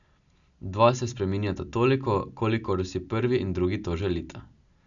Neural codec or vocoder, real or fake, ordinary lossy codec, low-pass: none; real; none; 7.2 kHz